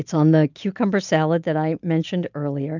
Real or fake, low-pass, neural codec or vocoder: real; 7.2 kHz; none